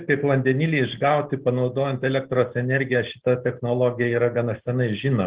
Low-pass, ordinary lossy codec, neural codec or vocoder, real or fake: 3.6 kHz; Opus, 16 kbps; none; real